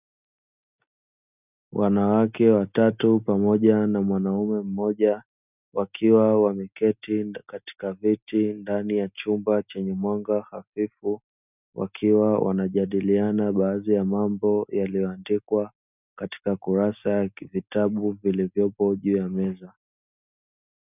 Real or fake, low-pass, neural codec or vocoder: real; 3.6 kHz; none